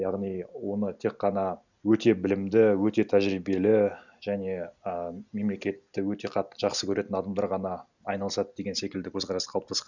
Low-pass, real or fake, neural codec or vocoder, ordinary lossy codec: 7.2 kHz; real; none; none